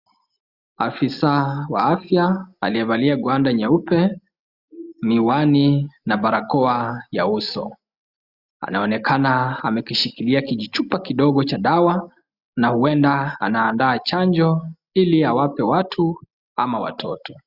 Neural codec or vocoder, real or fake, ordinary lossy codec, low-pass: none; real; Opus, 64 kbps; 5.4 kHz